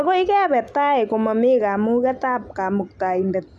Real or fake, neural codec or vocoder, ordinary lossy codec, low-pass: real; none; none; none